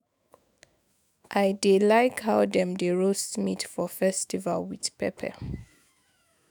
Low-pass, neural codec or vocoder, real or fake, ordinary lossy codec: none; autoencoder, 48 kHz, 128 numbers a frame, DAC-VAE, trained on Japanese speech; fake; none